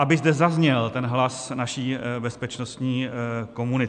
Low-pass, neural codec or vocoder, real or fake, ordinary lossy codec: 9.9 kHz; none; real; AAC, 96 kbps